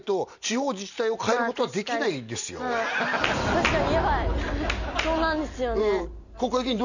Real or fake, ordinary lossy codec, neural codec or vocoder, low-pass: real; none; none; 7.2 kHz